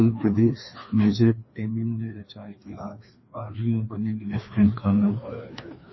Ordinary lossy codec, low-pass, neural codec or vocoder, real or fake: MP3, 24 kbps; 7.2 kHz; codec, 16 kHz, 2 kbps, FreqCodec, larger model; fake